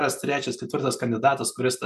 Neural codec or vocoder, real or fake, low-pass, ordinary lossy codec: none; real; 14.4 kHz; MP3, 96 kbps